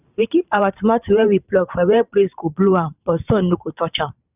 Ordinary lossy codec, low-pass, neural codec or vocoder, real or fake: none; 3.6 kHz; vocoder, 44.1 kHz, 128 mel bands every 512 samples, BigVGAN v2; fake